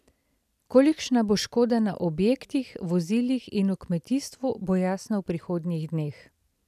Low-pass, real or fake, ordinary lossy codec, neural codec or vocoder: 14.4 kHz; real; none; none